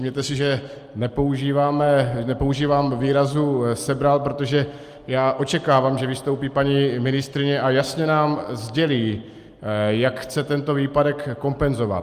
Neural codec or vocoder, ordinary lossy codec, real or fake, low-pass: none; Opus, 32 kbps; real; 14.4 kHz